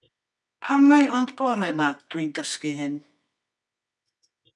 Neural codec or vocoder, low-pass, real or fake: codec, 24 kHz, 0.9 kbps, WavTokenizer, medium music audio release; 10.8 kHz; fake